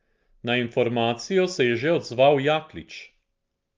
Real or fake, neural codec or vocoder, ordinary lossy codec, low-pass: real; none; Opus, 24 kbps; 7.2 kHz